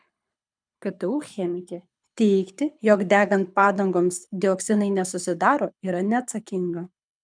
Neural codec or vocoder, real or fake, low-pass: codec, 24 kHz, 6 kbps, HILCodec; fake; 9.9 kHz